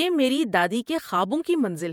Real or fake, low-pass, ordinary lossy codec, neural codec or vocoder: fake; 19.8 kHz; MP3, 96 kbps; vocoder, 48 kHz, 128 mel bands, Vocos